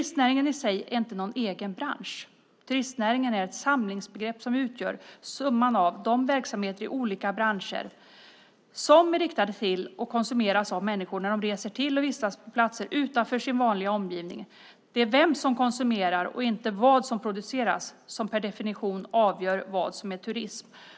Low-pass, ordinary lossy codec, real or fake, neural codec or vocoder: none; none; real; none